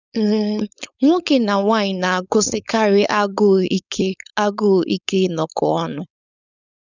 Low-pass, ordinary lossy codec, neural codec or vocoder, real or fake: 7.2 kHz; none; codec, 16 kHz, 4.8 kbps, FACodec; fake